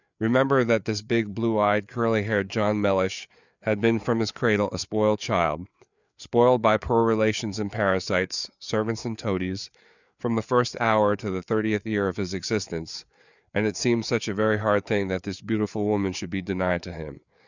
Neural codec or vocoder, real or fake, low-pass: codec, 16 kHz, 4 kbps, FreqCodec, larger model; fake; 7.2 kHz